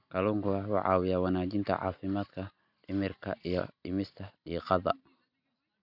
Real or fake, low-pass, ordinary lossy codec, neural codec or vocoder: real; 5.4 kHz; none; none